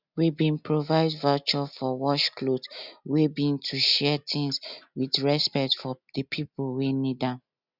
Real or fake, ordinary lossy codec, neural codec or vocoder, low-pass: real; none; none; 5.4 kHz